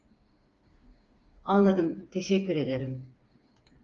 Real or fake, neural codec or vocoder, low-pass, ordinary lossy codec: fake; codec, 16 kHz, 4 kbps, FreqCodec, smaller model; 7.2 kHz; Opus, 32 kbps